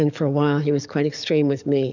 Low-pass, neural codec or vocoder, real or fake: 7.2 kHz; codec, 44.1 kHz, 7.8 kbps, DAC; fake